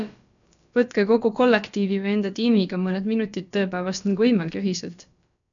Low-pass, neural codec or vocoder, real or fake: 7.2 kHz; codec, 16 kHz, about 1 kbps, DyCAST, with the encoder's durations; fake